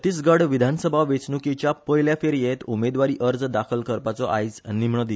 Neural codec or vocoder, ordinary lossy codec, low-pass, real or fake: none; none; none; real